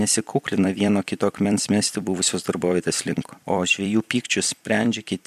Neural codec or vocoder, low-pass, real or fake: vocoder, 44.1 kHz, 128 mel bands every 256 samples, BigVGAN v2; 14.4 kHz; fake